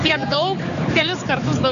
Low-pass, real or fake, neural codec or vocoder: 7.2 kHz; fake; codec, 16 kHz, 4 kbps, X-Codec, HuBERT features, trained on general audio